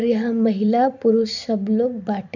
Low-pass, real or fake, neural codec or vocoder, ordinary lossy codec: 7.2 kHz; fake; vocoder, 44.1 kHz, 128 mel bands every 256 samples, BigVGAN v2; none